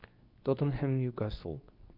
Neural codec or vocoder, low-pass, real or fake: codec, 24 kHz, 0.9 kbps, WavTokenizer, medium speech release version 2; 5.4 kHz; fake